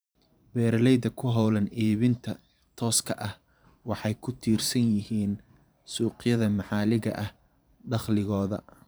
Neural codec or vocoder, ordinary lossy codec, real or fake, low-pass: vocoder, 44.1 kHz, 128 mel bands every 512 samples, BigVGAN v2; none; fake; none